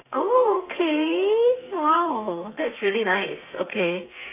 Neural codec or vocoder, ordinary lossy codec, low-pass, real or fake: codec, 32 kHz, 1.9 kbps, SNAC; AAC, 32 kbps; 3.6 kHz; fake